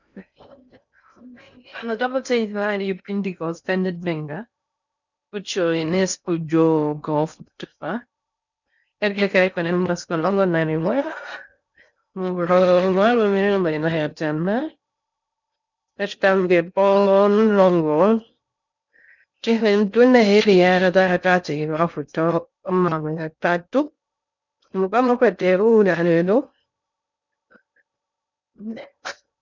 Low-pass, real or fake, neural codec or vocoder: 7.2 kHz; fake; codec, 16 kHz in and 24 kHz out, 0.6 kbps, FocalCodec, streaming, 4096 codes